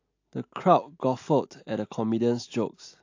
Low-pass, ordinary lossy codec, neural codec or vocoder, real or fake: 7.2 kHz; AAC, 48 kbps; none; real